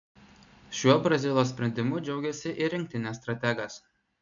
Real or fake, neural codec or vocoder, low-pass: real; none; 7.2 kHz